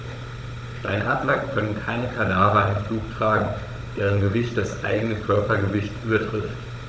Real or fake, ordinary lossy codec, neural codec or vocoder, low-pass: fake; none; codec, 16 kHz, 16 kbps, FunCodec, trained on Chinese and English, 50 frames a second; none